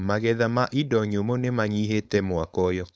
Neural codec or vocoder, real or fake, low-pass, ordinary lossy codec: codec, 16 kHz, 4.8 kbps, FACodec; fake; none; none